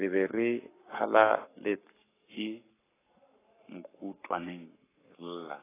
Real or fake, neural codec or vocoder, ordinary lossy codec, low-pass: fake; codec, 44.1 kHz, 7.8 kbps, Pupu-Codec; AAC, 16 kbps; 3.6 kHz